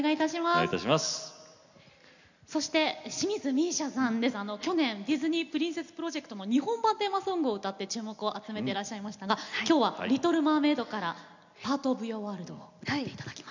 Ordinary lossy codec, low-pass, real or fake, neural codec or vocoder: none; 7.2 kHz; real; none